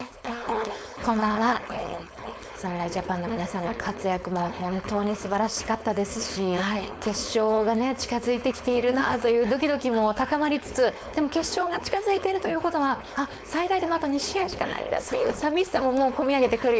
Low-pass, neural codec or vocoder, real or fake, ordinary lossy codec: none; codec, 16 kHz, 4.8 kbps, FACodec; fake; none